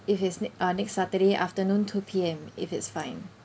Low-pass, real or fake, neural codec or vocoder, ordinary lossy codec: none; real; none; none